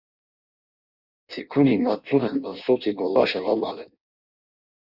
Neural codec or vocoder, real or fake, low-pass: codec, 16 kHz in and 24 kHz out, 0.6 kbps, FireRedTTS-2 codec; fake; 5.4 kHz